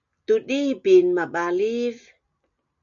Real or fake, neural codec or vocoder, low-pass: real; none; 7.2 kHz